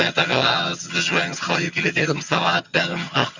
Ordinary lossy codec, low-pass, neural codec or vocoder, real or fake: Opus, 64 kbps; 7.2 kHz; vocoder, 22.05 kHz, 80 mel bands, HiFi-GAN; fake